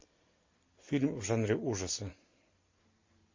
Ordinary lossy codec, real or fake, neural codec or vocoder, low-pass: MP3, 32 kbps; real; none; 7.2 kHz